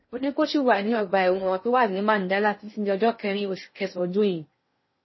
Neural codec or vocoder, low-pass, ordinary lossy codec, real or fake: codec, 16 kHz in and 24 kHz out, 0.6 kbps, FocalCodec, streaming, 2048 codes; 7.2 kHz; MP3, 24 kbps; fake